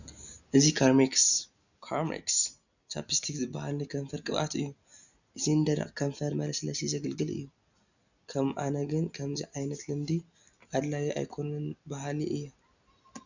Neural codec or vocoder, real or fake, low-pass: none; real; 7.2 kHz